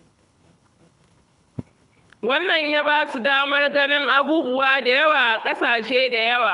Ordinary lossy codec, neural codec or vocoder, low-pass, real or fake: none; codec, 24 kHz, 3 kbps, HILCodec; 10.8 kHz; fake